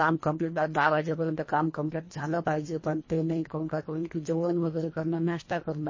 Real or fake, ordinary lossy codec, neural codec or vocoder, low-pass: fake; MP3, 32 kbps; codec, 24 kHz, 1.5 kbps, HILCodec; 7.2 kHz